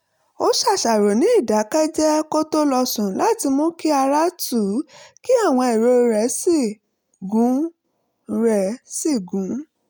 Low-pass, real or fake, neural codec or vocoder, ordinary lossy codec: none; real; none; none